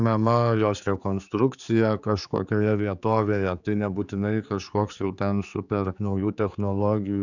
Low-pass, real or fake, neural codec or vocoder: 7.2 kHz; fake; codec, 16 kHz, 4 kbps, X-Codec, HuBERT features, trained on general audio